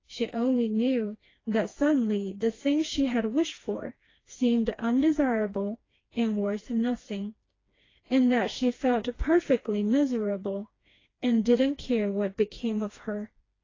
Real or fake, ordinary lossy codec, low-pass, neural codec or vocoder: fake; AAC, 32 kbps; 7.2 kHz; codec, 16 kHz, 2 kbps, FreqCodec, smaller model